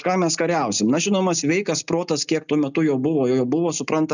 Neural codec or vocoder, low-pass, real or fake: none; 7.2 kHz; real